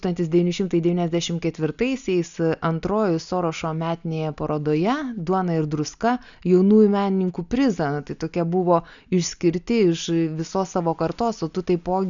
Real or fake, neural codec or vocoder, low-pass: real; none; 7.2 kHz